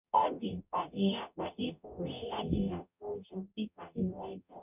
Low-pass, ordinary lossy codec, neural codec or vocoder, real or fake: 3.6 kHz; none; codec, 44.1 kHz, 0.9 kbps, DAC; fake